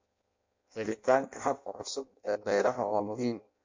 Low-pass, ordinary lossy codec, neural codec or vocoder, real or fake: 7.2 kHz; MP3, 48 kbps; codec, 16 kHz in and 24 kHz out, 0.6 kbps, FireRedTTS-2 codec; fake